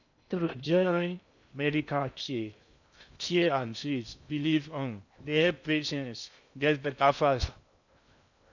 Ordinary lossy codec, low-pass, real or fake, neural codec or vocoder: none; 7.2 kHz; fake; codec, 16 kHz in and 24 kHz out, 0.6 kbps, FocalCodec, streaming, 2048 codes